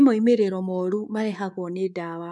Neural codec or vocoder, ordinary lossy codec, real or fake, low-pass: codec, 24 kHz, 3.1 kbps, DualCodec; none; fake; none